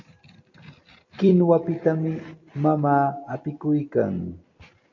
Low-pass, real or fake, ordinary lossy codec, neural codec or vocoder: 7.2 kHz; fake; MP3, 48 kbps; vocoder, 44.1 kHz, 128 mel bands every 256 samples, BigVGAN v2